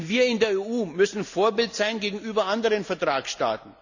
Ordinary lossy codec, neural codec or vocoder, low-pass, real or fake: none; none; 7.2 kHz; real